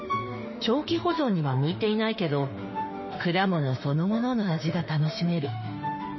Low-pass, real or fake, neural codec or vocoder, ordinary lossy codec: 7.2 kHz; fake; autoencoder, 48 kHz, 32 numbers a frame, DAC-VAE, trained on Japanese speech; MP3, 24 kbps